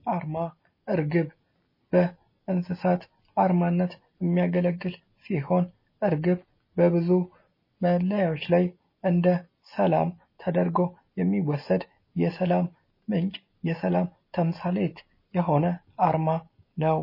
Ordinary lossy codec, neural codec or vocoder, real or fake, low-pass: MP3, 24 kbps; none; real; 5.4 kHz